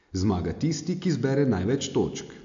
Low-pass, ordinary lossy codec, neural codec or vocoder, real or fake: 7.2 kHz; AAC, 64 kbps; none; real